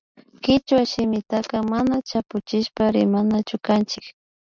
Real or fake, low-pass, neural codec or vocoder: real; 7.2 kHz; none